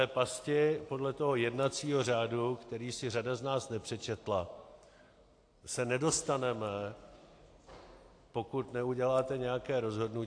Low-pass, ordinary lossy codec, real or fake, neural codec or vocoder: 9.9 kHz; AAC, 48 kbps; real; none